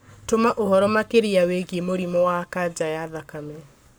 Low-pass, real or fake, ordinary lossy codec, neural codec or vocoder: none; fake; none; codec, 44.1 kHz, 7.8 kbps, Pupu-Codec